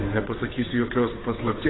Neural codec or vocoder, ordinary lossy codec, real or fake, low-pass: codec, 16 kHz, 8 kbps, FunCodec, trained on Chinese and English, 25 frames a second; AAC, 16 kbps; fake; 7.2 kHz